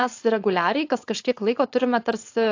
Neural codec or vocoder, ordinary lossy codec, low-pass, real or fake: codec, 16 kHz, 4.8 kbps, FACodec; AAC, 48 kbps; 7.2 kHz; fake